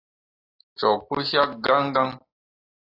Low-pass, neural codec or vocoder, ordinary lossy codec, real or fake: 5.4 kHz; none; AAC, 24 kbps; real